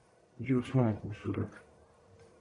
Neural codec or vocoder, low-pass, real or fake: codec, 44.1 kHz, 1.7 kbps, Pupu-Codec; 10.8 kHz; fake